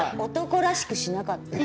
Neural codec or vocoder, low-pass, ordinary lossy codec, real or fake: none; none; none; real